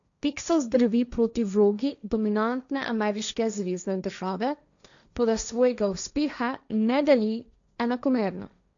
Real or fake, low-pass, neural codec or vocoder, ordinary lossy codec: fake; 7.2 kHz; codec, 16 kHz, 1.1 kbps, Voila-Tokenizer; none